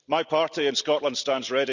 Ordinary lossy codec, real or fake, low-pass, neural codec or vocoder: none; real; 7.2 kHz; none